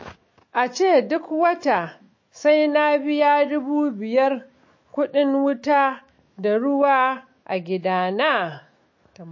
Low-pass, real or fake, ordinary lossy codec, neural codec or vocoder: 7.2 kHz; fake; MP3, 32 kbps; autoencoder, 48 kHz, 128 numbers a frame, DAC-VAE, trained on Japanese speech